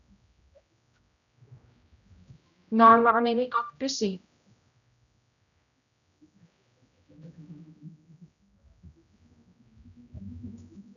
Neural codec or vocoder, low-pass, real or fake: codec, 16 kHz, 0.5 kbps, X-Codec, HuBERT features, trained on general audio; 7.2 kHz; fake